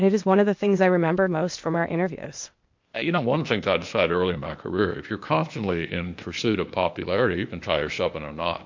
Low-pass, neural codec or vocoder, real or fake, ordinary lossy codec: 7.2 kHz; codec, 16 kHz, 0.8 kbps, ZipCodec; fake; MP3, 48 kbps